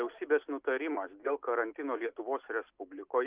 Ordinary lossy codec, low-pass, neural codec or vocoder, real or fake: Opus, 24 kbps; 3.6 kHz; none; real